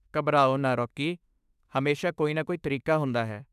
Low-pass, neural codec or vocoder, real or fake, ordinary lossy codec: 14.4 kHz; autoencoder, 48 kHz, 32 numbers a frame, DAC-VAE, trained on Japanese speech; fake; none